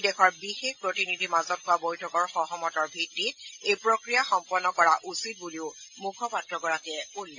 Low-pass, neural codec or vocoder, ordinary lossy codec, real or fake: 7.2 kHz; none; AAC, 48 kbps; real